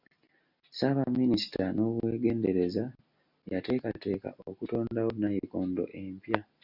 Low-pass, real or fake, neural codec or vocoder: 5.4 kHz; real; none